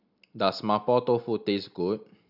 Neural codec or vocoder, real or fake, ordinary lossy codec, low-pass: none; real; none; 5.4 kHz